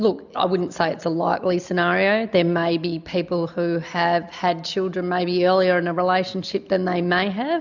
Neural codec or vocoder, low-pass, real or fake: none; 7.2 kHz; real